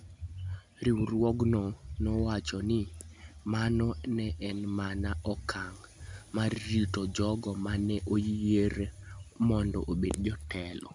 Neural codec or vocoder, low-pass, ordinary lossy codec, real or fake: none; 10.8 kHz; none; real